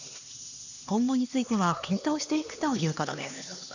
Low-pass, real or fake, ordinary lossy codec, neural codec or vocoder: 7.2 kHz; fake; none; codec, 16 kHz, 2 kbps, X-Codec, HuBERT features, trained on LibriSpeech